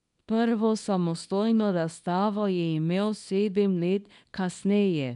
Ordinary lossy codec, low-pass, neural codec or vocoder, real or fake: none; 10.8 kHz; codec, 24 kHz, 0.9 kbps, WavTokenizer, small release; fake